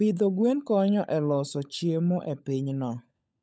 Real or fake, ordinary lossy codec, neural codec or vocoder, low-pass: fake; none; codec, 16 kHz, 16 kbps, FunCodec, trained on LibriTTS, 50 frames a second; none